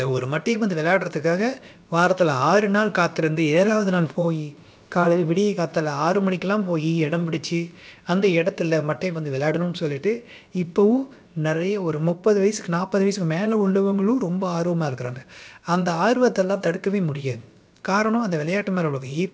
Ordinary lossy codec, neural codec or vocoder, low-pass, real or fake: none; codec, 16 kHz, about 1 kbps, DyCAST, with the encoder's durations; none; fake